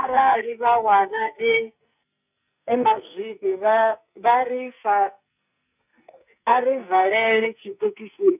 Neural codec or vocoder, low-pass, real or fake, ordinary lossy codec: codec, 44.1 kHz, 2.6 kbps, SNAC; 3.6 kHz; fake; none